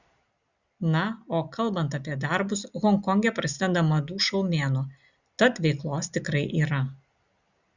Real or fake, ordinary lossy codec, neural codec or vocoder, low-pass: real; Opus, 64 kbps; none; 7.2 kHz